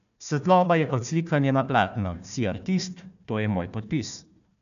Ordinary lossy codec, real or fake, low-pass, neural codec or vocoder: none; fake; 7.2 kHz; codec, 16 kHz, 1 kbps, FunCodec, trained on Chinese and English, 50 frames a second